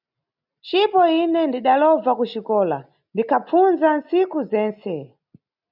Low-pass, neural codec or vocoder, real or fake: 5.4 kHz; none; real